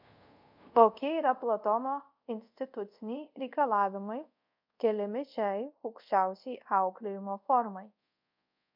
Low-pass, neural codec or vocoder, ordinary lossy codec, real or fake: 5.4 kHz; codec, 24 kHz, 0.5 kbps, DualCodec; MP3, 48 kbps; fake